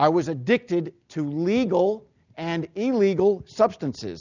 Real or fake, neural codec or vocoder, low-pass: real; none; 7.2 kHz